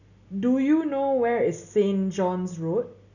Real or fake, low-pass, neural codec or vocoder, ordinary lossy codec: real; 7.2 kHz; none; none